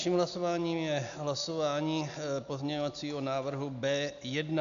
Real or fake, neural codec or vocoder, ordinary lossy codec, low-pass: real; none; MP3, 96 kbps; 7.2 kHz